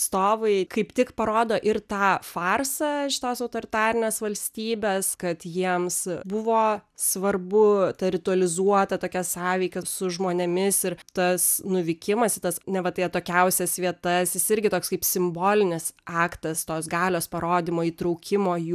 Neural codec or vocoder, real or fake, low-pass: none; real; 14.4 kHz